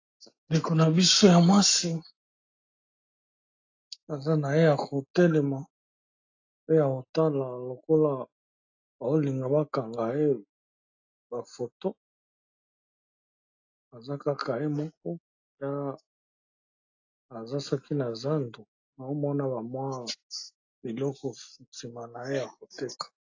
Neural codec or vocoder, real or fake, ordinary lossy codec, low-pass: none; real; AAC, 48 kbps; 7.2 kHz